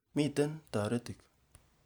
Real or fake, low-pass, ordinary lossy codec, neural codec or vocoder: real; none; none; none